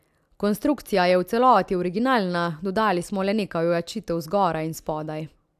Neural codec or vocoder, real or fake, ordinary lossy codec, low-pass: none; real; AAC, 96 kbps; 14.4 kHz